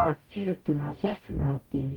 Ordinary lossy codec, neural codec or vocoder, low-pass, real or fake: Opus, 32 kbps; codec, 44.1 kHz, 0.9 kbps, DAC; 19.8 kHz; fake